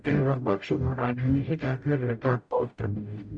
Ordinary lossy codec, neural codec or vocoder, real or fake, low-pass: none; codec, 44.1 kHz, 0.9 kbps, DAC; fake; 9.9 kHz